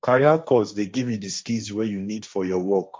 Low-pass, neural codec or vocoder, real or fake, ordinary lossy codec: none; codec, 16 kHz, 1.1 kbps, Voila-Tokenizer; fake; none